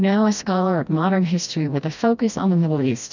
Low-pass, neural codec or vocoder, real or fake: 7.2 kHz; codec, 16 kHz, 1 kbps, FreqCodec, smaller model; fake